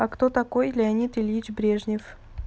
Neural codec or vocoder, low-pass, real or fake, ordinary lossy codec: none; none; real; none